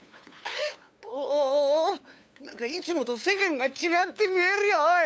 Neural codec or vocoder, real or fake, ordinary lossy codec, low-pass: codec, 16 kHz, 2 kbps, FunCodec, trained on LibriTTS, 25 frames a second; fake; none; none